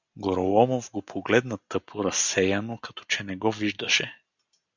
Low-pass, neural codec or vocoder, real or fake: 7.2 kHz; none; real